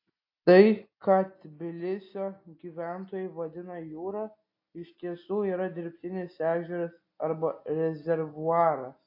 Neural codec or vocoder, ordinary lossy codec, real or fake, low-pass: none; AAC, 32 kbps; real; 5.4 kHz